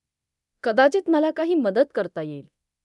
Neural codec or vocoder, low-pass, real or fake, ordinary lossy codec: codec, 24 kHz, 0.9 kbps, DualCodec; none; fake; none